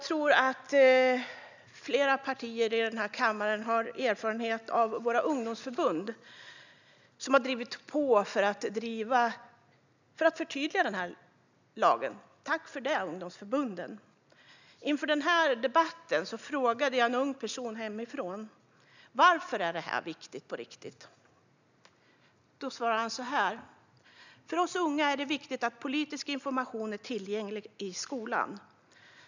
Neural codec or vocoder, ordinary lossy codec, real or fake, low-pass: none; none; real; 7.2 kHz